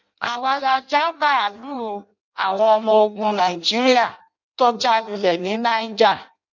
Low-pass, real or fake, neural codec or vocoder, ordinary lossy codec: 7.2 kHz; fake; codec, 16 kHz in and 24 kHz out, 0.6 kbps, FireRedTTS-2 codec; none